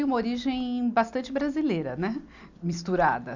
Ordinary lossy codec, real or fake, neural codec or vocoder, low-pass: none; real; none; 7.2 kHz